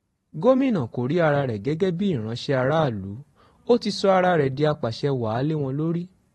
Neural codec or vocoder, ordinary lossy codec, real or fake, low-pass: vocoder, 44.1 kHz, 128 mel bands every 512 samples, BigVGAN v2; AAC, 32 kbps; fake; 19.8 kHz